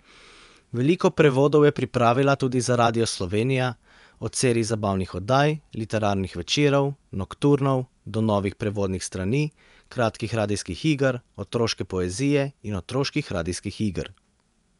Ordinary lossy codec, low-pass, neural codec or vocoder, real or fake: none; 10.8 kHz; vocoder, 24 kHz, 100 mel bands, Vocos; fake